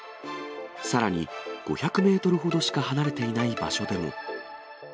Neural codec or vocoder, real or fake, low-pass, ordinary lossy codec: none; real; none; none